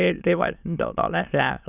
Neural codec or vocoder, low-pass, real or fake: autoencoder, 22.05 kHz, a latent of 192 numbers a frame, VITS, trained on many speakers; 3.6 kHz; fake